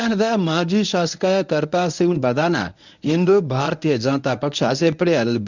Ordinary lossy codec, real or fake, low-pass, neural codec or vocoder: none; fake; 7.2 kHz; codec, 24 kHz, 0.9 kbps, WavTokenizer, medium speech release version 1